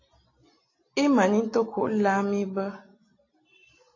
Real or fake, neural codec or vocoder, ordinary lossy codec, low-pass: real; none; AAC, 32 kbps; 7.2 kHz